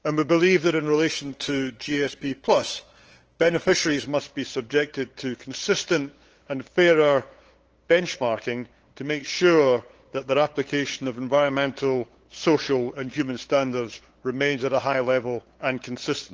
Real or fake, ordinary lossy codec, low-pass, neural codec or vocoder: fake; Opus, 16 kbps; 7.2 kHz; codec, 16 kHz, 8 kbps, FunCodec, trained on LibriTTS, 25 frames a second